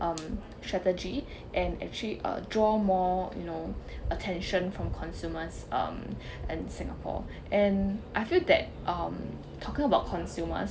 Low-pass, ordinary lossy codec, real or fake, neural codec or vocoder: none; none; real; none